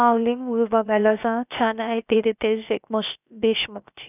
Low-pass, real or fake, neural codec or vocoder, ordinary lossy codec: 3.6 kHz; fake; codec, 16 kHz, about 1 kbps, DyCAST, with the encoder's durations; none